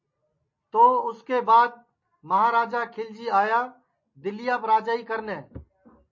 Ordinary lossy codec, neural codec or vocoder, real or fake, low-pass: MP3, 32 kbps; none; real; 7.2 kHz